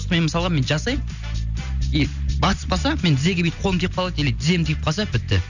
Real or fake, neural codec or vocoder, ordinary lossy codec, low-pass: real; none; none; 7.2 kHz